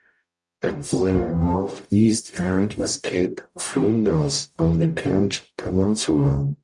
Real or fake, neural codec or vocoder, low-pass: fake; codec, 44.1 kHz, 0.9 kbps, DAC; 10.8 kHz